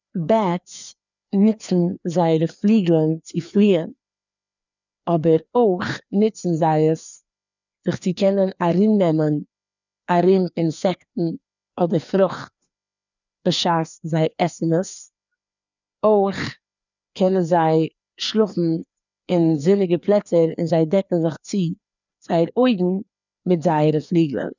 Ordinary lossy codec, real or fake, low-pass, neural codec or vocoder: none; fake; 7.2 kHz; codec, 16 kHz, 2 kbps, FreqCodec, larger model